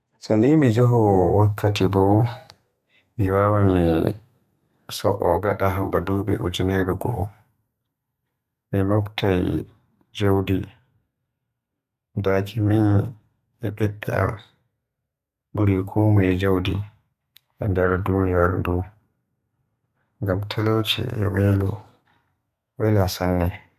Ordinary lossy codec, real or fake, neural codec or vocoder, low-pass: none; fake; codec, 32 kHz, 1.9 kbps, SNAC; 14.4 kHz